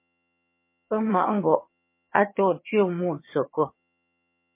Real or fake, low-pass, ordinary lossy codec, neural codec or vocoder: fake; 3.6 kHz; MP3, 24 kbps; vocoder, 22.05 kHz, 80 mel bands, HiFi-GAN